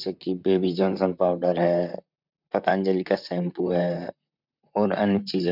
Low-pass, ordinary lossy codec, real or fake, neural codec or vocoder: 5.4 kHz; none; fake; vocoder, 44.1 kHz, 128 mel bands, Pupu-Vocoder